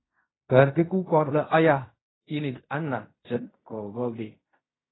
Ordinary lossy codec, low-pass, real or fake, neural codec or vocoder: AAC, 16 kbps; 7.2 kHz; fake; codec, 16 kHz in and 24 kHz out, 0.4 kbps, LongCat-Audio-Codec, fine tuned four codebook decoder